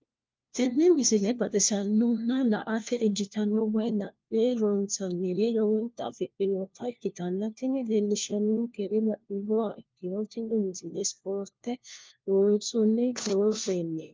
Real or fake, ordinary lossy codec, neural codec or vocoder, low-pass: fake; Opus, 24 kbps; codec, 16 kHz, 1 kbps, FunCodec, trained on LibriTTS, 50 frames a second; 7.2 kHz